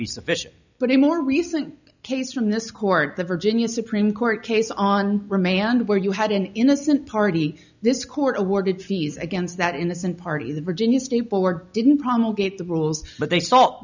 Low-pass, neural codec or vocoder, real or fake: 7.2 kHz; none; real